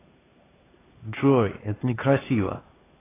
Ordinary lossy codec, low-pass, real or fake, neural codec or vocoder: AAC, 16 kbps; 3.6 kHz; fake; codec, 16 kHz, 2 kbps, X-Codec, HuBERT features, trained on LibriSpeech